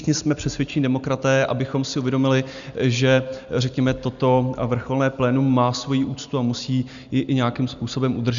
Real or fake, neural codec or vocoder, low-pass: real; none; 7.2 kHz